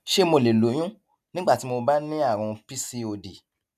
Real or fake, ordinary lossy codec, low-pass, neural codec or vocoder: real; none; 14.4 kHz; none